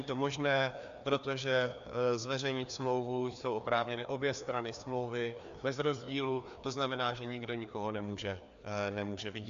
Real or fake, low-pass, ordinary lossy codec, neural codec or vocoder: fake; 7.2 kHz; AAC, 64 kbps; codec, 16 kHz, 2 kbps, FreqCodec, larger model